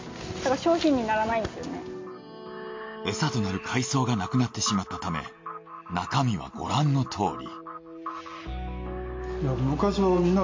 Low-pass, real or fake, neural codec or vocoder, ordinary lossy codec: 7.2 kHz; real; none; MP3, 48 kbps